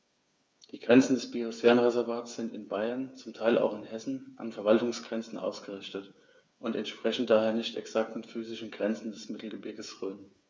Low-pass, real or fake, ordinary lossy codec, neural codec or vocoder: none; fake; none; codec, 16 kHz, 8 kbps, FreqCodec, smaller model